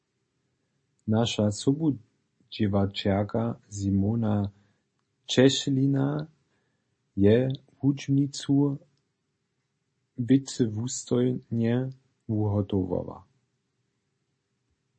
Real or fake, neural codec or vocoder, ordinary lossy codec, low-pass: real; none; MP3, 32 kbps; 9.9 kHz